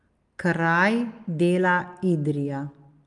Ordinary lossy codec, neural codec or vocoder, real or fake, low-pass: Opus, 32 kbps; none; real; 10.8 kHz